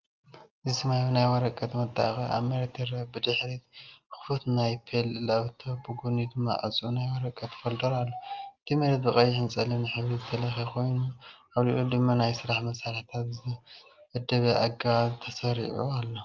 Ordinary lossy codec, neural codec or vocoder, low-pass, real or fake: Opus, 24 kbps; none; 7.2 kHz; real